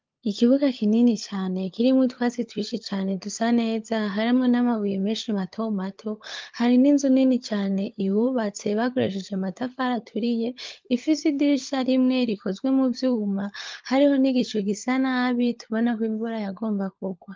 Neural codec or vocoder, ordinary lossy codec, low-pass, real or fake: codec, 16 kHz, 16 kbps, FunCodec, trained on LibriTTS, 50 frames a second; Opus, 32 kbps; 7.2 kHz; fake